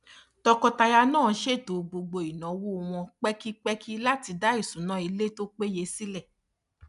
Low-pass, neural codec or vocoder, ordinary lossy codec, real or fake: 10.8 kHz; none; none; real